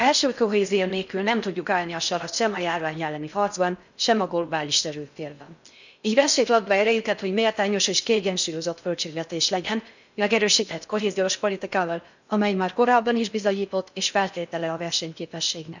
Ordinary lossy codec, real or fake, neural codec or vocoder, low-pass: none; fake; codec, 16 kHz in and 24 kHz out, 0.6 kbps, FocalCodec, streaming, 4096 codes; 7.2 kHz